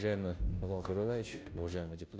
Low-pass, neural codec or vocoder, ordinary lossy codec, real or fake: none; codec, 16 kHz, 0.5 kbps, FunCodec, trained on Chinese and English, 25 frames a second; none; fake